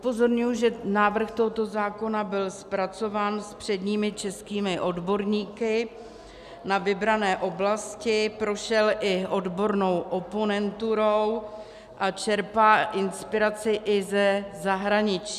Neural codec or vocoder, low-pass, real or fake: none; 14.4 kHz; real